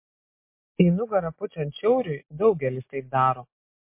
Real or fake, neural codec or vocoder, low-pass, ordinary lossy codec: real; none; 3.6 kHz; MP3, 24 kbps